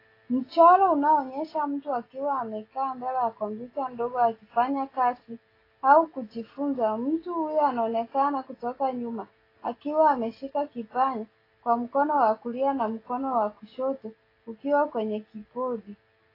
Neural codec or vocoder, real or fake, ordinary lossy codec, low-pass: none; real; AAC, 24 kbps; 5.4 kHz